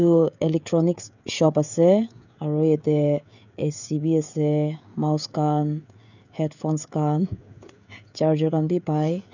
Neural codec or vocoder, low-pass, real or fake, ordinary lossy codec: codec, 16 kHz, 16 kbps, FreqCodec, smaller model; 7.2 kHz; fake; none